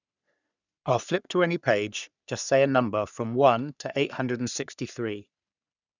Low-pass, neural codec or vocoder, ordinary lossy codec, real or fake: 7.2 kHz; codec, 44.1 kHz, 3.4 kbps, Pupu-Codec; none; fake